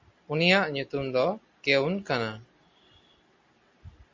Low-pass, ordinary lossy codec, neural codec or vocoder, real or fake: 7.2 kHz; MP3, 48 kbps; none; real